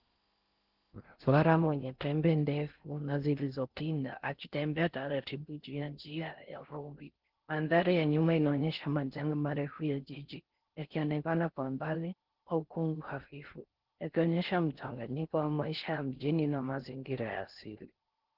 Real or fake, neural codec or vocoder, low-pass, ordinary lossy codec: fake; codec, 16 kHz in and 24 kHz out, 0.6 kbps, FocalCodec, streaming, 4096 codes; 5.4 kHz; Opus, 16 kbps